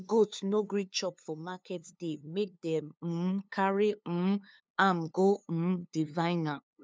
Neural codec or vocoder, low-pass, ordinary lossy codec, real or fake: codec, 16 kHz, 2 kbps, FunCodec, trained on LibriTTS, 25 frames a second; none; none; fake